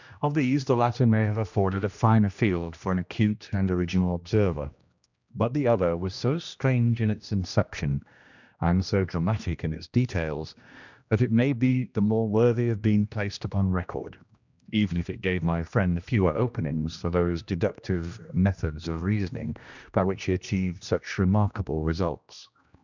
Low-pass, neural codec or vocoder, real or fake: 7.2 kHz; codec, 16 kHz, 1 kbps, X-Codec, HuBERT features, trained on general audio; fake